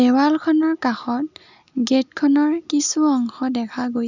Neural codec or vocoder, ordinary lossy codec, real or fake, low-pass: none; none; real; 7.2 kHz